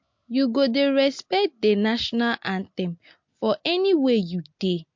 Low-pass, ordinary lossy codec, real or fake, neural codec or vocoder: 7.2 kHz; MP3, 48 kbps; real; none